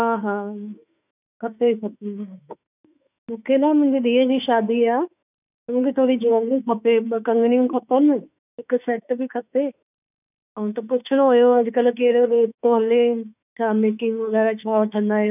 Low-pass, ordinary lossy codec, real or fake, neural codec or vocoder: 3.6 kHz; none; fake; autoencoder, 48 kHz, 32 numbers a frame, DAC-VAE, trained on Japanese speech